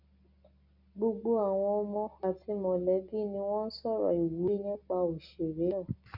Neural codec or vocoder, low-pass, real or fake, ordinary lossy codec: none; 5.4 kHz; real; none